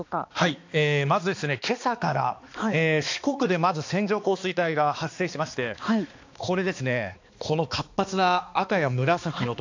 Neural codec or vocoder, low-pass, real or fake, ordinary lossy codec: codec, 16 kHz, 2 kbps, X-Codec, HuBERT features, trained on balanced general audio; 7.2 kHz; fake; AAC, 48 kbps